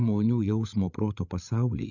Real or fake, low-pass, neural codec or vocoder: fake; 7.2 kHz; codec, 16 kHz, 16 kbps, FreqCodec, larger model